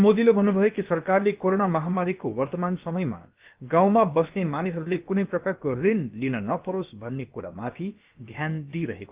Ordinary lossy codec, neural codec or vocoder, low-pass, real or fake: Opus, 24 kbps; codec, 16 kHz, about 1 kbps, DyCAST, with the encoder's durations; 3.6 kHz; fake